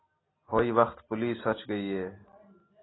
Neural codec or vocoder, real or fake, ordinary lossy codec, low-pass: none; real; AAC, 16 kbps; 7.2 kHz